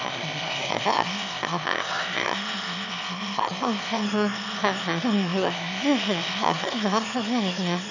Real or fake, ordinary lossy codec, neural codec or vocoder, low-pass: fake; none; autoencoder, 22.05 kHz, a latent of 192 numbers a frame, VITS, trained on one speaker; 7.2 kHz